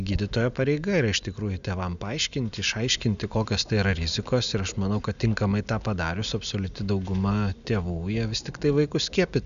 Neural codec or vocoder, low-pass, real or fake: none; 7.2 kHz; real